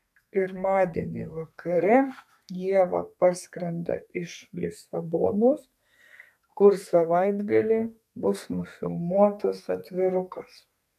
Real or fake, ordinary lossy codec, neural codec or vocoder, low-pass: fake; MP3, 96 kbps; codec, 32 kHz, 1.9 kbps, SNAC; 14.4 kHz